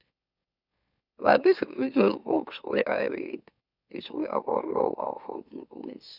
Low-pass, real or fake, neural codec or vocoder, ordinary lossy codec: 5.4 kHz; fake; autoencoder, 44.1 kHz, a latent of 192 numbers a frame, MeloTTS; none